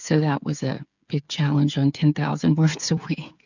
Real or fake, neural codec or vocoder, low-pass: fake; codec, 16 kHz, 8 kbps, FreqCodec, smaller model; 7.2 kHz